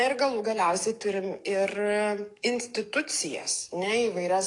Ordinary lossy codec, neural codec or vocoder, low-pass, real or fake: AAC, 48 kbps; codec, 44.1 kHz, 7.8 kbps, DAC; 10.8 kHz; fake